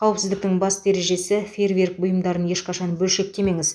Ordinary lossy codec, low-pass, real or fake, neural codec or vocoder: none; none; real; none